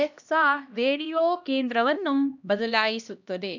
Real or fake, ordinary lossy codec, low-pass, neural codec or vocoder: fake; none; 7.2 kHz; codec, 16 kHz, 1 kbps, X-Codec, HuBERT features, trained on LibriSpeech